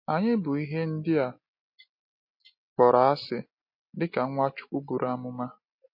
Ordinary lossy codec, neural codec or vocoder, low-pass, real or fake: MP3, 32 kbps; none; 5.4 kHz; real